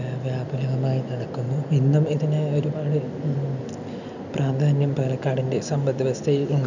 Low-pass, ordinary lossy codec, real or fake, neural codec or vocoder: 7.2 kHz; none; real; none